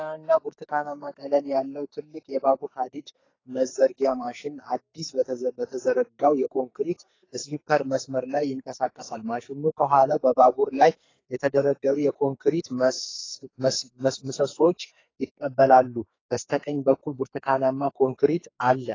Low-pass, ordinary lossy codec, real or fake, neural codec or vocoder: 7.2 kHz; AAC, 32 kbps; fake; codec, 44.1 kHz, 2.6 kbps, SNAC